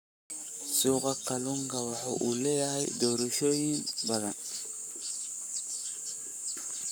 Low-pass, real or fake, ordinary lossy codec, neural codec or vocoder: none; fake; none; codec, 44.1 kHz, 7.8 kbps, Pupu-Codec